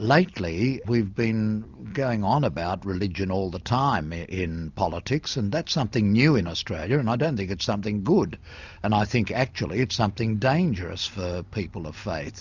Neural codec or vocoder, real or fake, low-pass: none; real; 7.2 kHz